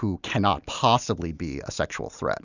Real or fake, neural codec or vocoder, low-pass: real; none; 7.2 kHz